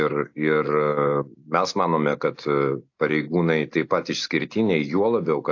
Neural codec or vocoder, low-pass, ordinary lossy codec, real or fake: none; 7.2 kHz; AAC, 48 kbps; real